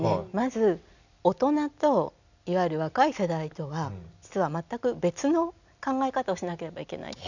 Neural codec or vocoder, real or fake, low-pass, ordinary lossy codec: none; real; 7.2 kHz; none